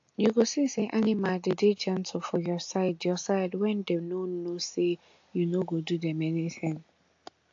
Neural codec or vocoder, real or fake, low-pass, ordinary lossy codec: none; real; 7.2 kHz; AAC, 48 kbps